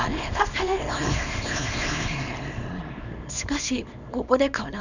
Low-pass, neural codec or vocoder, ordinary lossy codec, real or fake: 7.2 kHz; codec, 24 kHz, 0.9 kbps, WavTokenizer, small release; none; fake